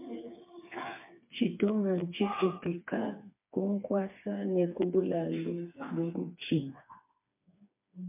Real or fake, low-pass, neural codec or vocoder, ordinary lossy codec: fake; 3.6 kHz; codec, 16 kHz, 4 kbps, FreqCodec, smaller model; MP3, 32 kbps